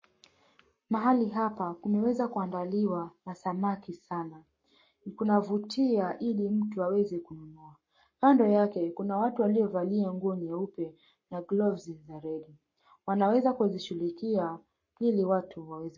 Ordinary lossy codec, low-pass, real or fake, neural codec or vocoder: MP3, 32 kbps; 7.2 kHz; fake; codec, 44.1 kHz, 7.8 kbps, Pupu-Codec